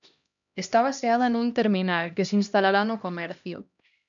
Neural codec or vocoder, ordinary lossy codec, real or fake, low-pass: codec, 16 kHz, 1 kbps, X-Codec, HuBERT features, trained on LibriSpeech; MP3, 96 kbps; fake; 7.2 kHz